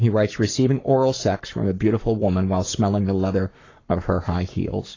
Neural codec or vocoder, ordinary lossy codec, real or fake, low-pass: codec, 44.1 kHz, 7.8 kbps, Pupu-Codec; AAC, 32 kbps; fake; 7.2 kHz